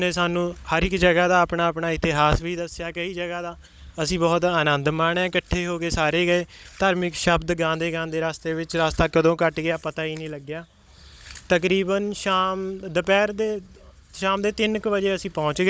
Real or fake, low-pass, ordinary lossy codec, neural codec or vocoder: fake; none; none; codec, 16 kHz, 16 kbps, FunCodec, trained on Chinese and English, 50 frames a second